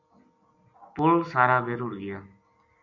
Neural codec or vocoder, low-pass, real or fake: none; 7.2 kHz; real